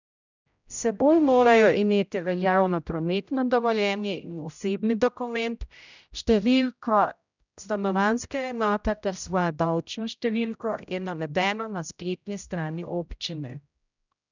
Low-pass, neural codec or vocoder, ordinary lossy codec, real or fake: 7.2 kHz; codec, 16 kHz, 0.5 kbps, X-Codec, HuBERT features, trained on general audio; none; fake